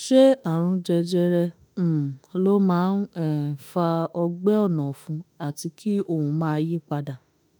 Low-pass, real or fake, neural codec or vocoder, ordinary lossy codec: none; fake; autoencoder, 48 kHz, 32 numbers a frame, DAC-VAE, trained on Japanese speech; none